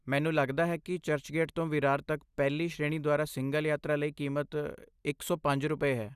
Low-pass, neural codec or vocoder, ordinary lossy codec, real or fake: 14.4 kHz; none; Opus, 64 kbps; real